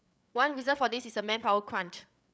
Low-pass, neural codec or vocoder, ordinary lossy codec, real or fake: none; codec, 16 kHz, 8 kbps, FreqCodec, larger model; none; fake